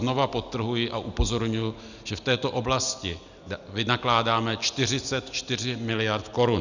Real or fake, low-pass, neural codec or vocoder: real; 7.2 kHz; none